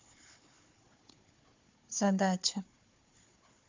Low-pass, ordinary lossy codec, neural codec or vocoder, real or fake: 7.2 kHz; MP3, 64 kbps; codec, 16 kHz, 4 kbps, FunCodec, trained on Chinese and English, 50 frames a second; fake